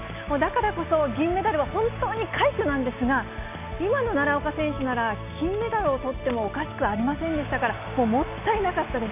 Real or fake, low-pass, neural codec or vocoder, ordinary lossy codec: real; 3.6 kHz; none; none